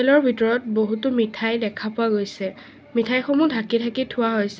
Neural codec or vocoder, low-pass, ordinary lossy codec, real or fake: none; none; none; real